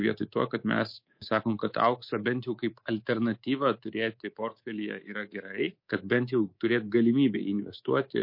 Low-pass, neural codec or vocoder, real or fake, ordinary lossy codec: 5.4 kHz; codec, 24 kHz, 3.1 kbps, DualCodec; fake; MP3, 32 kbps